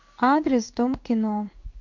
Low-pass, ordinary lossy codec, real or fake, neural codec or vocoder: 7.2 kHz; MP3, 48 kbps; fake; codec, 16 kHz in and 24 kHz out, 1 kbps, XY-Tokenizer